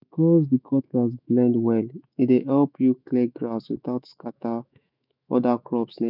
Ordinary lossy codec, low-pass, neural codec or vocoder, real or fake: none; 5.4 kHz; autoencoder, 48 kHz, 128 numbers a frame, DAC-VAE, trained on Japanese speech; fake